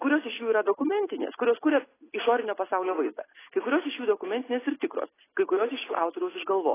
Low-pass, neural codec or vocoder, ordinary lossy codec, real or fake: 3.6 kHz; none; AAC, 16 kbps; real